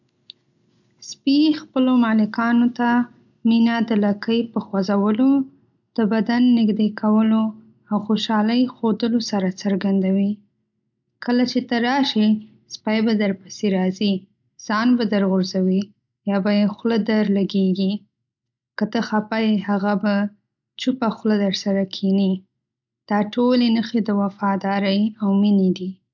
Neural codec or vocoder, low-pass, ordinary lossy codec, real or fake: none; 7.2 kHz; none; real